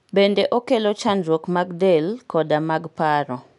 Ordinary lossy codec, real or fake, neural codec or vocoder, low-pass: none; real; none; 10.8 kHz